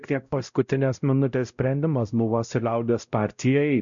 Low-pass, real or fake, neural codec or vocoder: 7.2 kHz; fake; codec, 16 kHz, 0.5 kbps, X-Codec, WavLM features, trained on Multilingual LibriSpeech